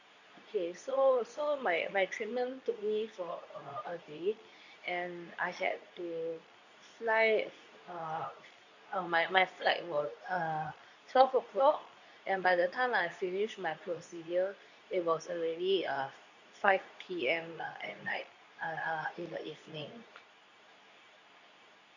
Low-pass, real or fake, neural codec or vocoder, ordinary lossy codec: 7.2 kHz; fake; codec, 24 kHz, 0.9 kbps, WavTokenizer, medium speech release version 2; none